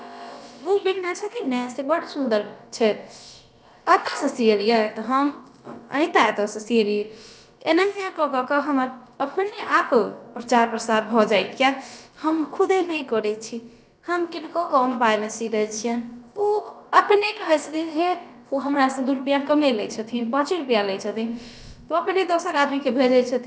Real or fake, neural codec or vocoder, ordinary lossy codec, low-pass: fake; codec, 16 kHz, about 1 kbps, DyCAST, with the encoder's durations; none; none